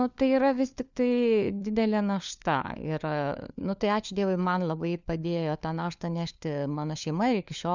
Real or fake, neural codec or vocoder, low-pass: fake; codec, 16 kHz, 4 kbps, FunCodec, trained on LibriTTS, 50 frames a second; 7.2 kHz